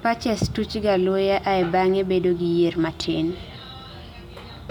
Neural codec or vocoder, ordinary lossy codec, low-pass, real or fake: none; none; 19.8 kHz; real